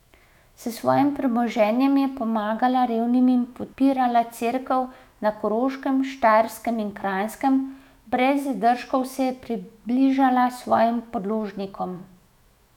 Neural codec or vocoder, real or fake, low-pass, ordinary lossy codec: autoencoder, 48 kHz, 128 numbers a frame, DAC-VAE, trained on Japanese speech; fake; 19.8 kHz; none